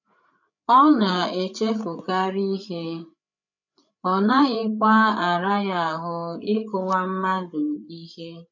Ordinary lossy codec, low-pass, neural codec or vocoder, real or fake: none; 7.2 kHz; codec, 16 kHz, 8 kbps, FreqCodec, larger model; fake